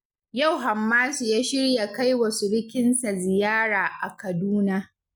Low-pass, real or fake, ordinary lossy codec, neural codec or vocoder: none; real; none; none